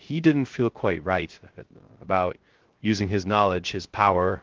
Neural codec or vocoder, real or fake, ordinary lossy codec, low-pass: codec, 16 kHz, 0.3 kbps, FocalCodec; fake; Opus, 16 kbps; 7.2 kHz